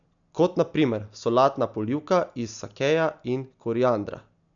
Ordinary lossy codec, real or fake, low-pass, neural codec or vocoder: none; real; 7.2 kHz; none